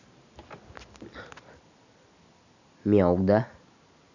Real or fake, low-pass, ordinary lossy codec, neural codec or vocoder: real; 7.2 kHz; none; none